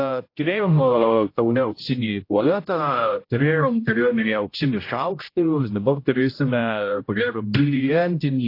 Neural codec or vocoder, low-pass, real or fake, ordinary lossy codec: codec, 16 kHz, 0.5 kbps, X-Codec, HuBERT features, trained on general audio; 5.4 kHz; fake; AAC, 32 kbps